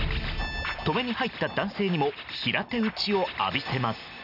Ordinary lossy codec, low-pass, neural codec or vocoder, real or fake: none; 5.4 kHz; none; real